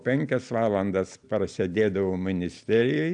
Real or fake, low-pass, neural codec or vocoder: real; 9.9 kHz; none